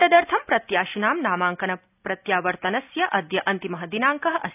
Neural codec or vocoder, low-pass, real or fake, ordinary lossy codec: none; 3.6 kHz; real; none